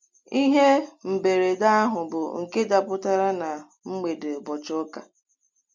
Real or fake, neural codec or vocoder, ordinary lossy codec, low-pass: real; none; MP3, 64 kbps; 7.2 kHz